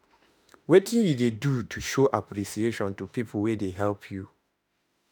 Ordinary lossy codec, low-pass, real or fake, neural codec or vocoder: none; none; fake; autoencoder, 48 kHz, 32 numbers a frame, DAC-VAE, trained on Japanese speech